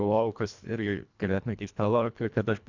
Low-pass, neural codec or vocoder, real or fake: 7.2 kHz; codec, 24 kHz, 1.5 kbps, HILCodec; fake